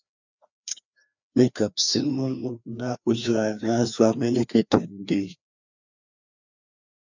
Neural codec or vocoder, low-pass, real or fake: codec, 16 kHz, 2 kbps, FreqCodec, larger model; 7.2 kHz; fake